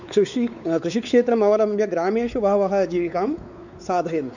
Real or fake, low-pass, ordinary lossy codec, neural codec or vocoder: fake; 7.2 kHz; none; codec, 16 kHz, 4 kbps, X-Codec, WavLM features, trained on Multilingual LibriSpeech